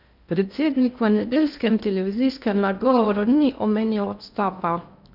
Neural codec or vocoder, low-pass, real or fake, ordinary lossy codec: codec, 16 kHz in and 24 kHz out, 0.6 kbps, FocalCodec, streaming, 2048 codes; 5.4 kHz; fake; none